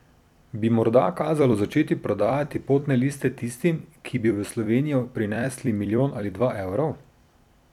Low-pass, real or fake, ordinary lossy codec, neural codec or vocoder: 19.8 kHz; fake; none; vocoder, 44.1 kHz, 128 mel bands every 256 samples, BigVGAN v2